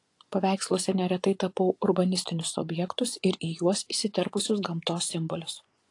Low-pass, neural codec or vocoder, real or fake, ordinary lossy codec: 10.8 kHz; vocoder, 24 kHz, 100 mel bands, Vocos; fake; AAC, 48 kbps